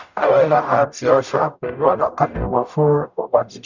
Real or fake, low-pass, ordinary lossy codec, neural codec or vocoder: fake; 7.2 kHz; none; codec, 44.1 kHz, 0.9 kbps, DAC